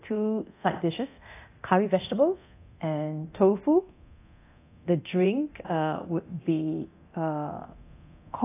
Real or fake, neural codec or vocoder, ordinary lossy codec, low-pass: fake; codec, 24 kHz, 0.9 kbps, DualCodec; AAC, 24 kbps; 3.6 kHz